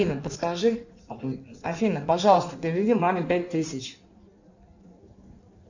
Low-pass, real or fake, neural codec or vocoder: 7.2 kHz; fake; codec, 16 kHz in and 24 kHz out, 1.1 kbps, FireRedTTS-2 codec